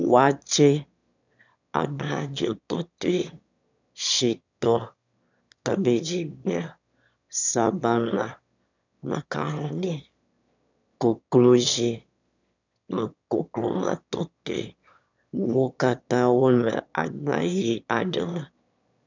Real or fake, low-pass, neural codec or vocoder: fake; 7.2 kHz; autoencoder, 22.05 kHz, a latent of 192 numbers a frame, VITS, trained on one speaker